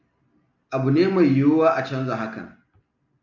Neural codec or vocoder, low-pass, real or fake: none; 7.2 kHz; real